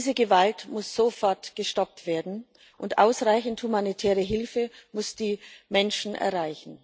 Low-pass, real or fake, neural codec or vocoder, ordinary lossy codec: none; real; none; none